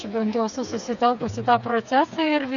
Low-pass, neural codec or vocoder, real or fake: 7.2 kHz; codec, 16 kHz, 4 kbps, FreqCodec, smaller model; fake